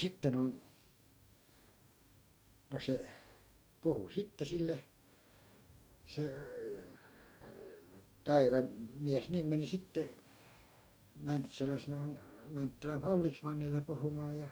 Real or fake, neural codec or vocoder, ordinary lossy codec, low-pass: fake; codec, 44.1 kHz, 2.6 kbps, DAC; none; none